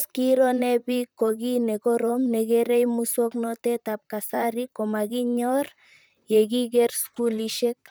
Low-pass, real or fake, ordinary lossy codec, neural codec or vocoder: none; fake; none; vocoder, 44.1 kHz, 128 mel bands, Pupu-Vocoder